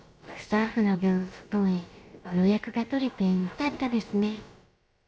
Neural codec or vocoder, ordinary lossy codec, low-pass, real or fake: codec, 16 kHz, about 1 kbps, DyCAST, with the encoder's durations; none; none; fake